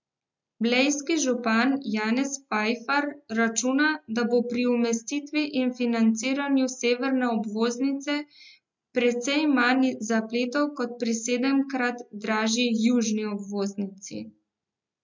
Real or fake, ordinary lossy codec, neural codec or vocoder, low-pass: real; MP3, 64 kbps; none; 7.2 kHz